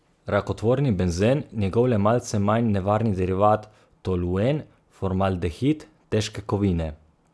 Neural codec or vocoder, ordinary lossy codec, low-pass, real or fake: none; none; none; real